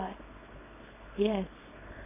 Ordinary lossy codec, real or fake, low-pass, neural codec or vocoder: AAC, 16 kbps; fake; 3.6 kHz; codec, 24 kHz, 0.9 kbps, WavTokenizer, small release